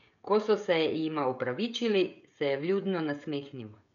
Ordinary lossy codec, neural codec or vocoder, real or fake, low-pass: none; codec, 16 kHz, 16 kbps, FreqCodec, smaller model; fake; 7.2 kHz